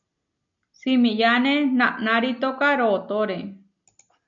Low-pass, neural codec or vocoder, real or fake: 7.2 kHz; none; real